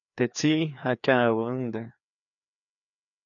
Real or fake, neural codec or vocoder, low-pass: fake; codec, 16 kHz, 2 kbps, FreqCodec, larger model; 7.2 kHz